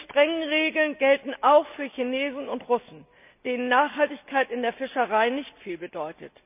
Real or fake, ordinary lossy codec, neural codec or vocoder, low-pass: real; none; none; 3.6 kHz